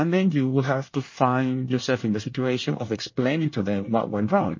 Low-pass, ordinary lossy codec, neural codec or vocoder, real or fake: 7.2 kHz; MP3, 32 kbps; codec, 24 kHz, 1 kbps, SNAC; fake